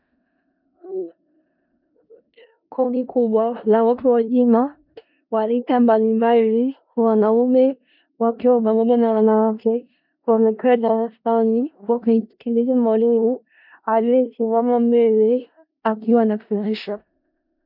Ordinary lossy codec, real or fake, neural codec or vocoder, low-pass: MP3, 48 kbps; fake; codec, 16 kHz in and 24 kHz out, 0.4 kbps, LongCat-Audio-Codec, four codebook decoder; 5.4 kHz